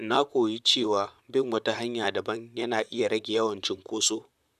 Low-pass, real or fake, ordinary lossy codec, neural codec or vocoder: 14.4 kHz; fake; none; vocoder, 44.1 kHz, 128 mel bands, Pupu-Vocoder